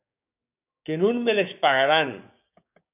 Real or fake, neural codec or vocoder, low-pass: fake; codec, 16 kHz, 6 kbps, DAC; 3.6 kHz